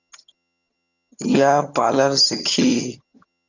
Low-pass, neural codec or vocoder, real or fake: 7.2 kHz; vocoder, 22.05 kHz, 80 mel bands, HiFi-GAN; fake